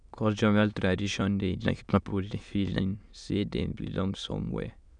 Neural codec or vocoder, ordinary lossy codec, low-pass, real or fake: autoencoder, 22.05 kHz, a latent of 192 numbers a frame, VITS, trained on many speakers; none; 9.9 kHz; fake